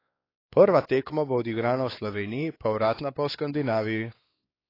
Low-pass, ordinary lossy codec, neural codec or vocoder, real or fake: 5.4 kHz; AAC, 24 kbps; codec, 16 kHz, 4 kbps, X-Codec, WavLM features, trained on Multilingual LibriSpeech; fake